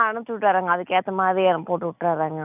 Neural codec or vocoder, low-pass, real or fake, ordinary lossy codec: none; 3.6 kHz; real; none